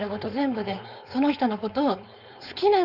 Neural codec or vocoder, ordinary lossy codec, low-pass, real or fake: codec, 16 kHz, 4.8 kbps, FACodec; none; 5.4 kHz; fake